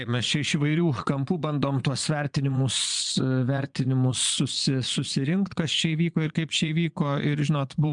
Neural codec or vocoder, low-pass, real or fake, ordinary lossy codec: vocoder, 22.05 kHz, 80 mel bands, Vocos; 9.9 kHz; fake; MP3, 96 kbps